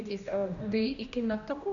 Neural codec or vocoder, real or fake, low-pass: codec, 16 kHz, 1 kbps, X-Codec, HuBERT features, trained on general audio; fake; 7.2 kHz